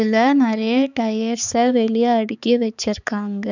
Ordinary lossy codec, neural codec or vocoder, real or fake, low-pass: none; codec, 16 kHz, 4 kbps, X-Codec, HuBERT features, trained on balanced general audio; fake; 7.2 kHz